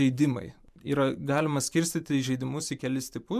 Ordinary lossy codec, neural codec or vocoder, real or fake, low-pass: MP3, 96 kbps; vocoder, 44.1 kHz, 128 mel bands every 512 samples, BigVGAN v2; fake; 14.4 kHz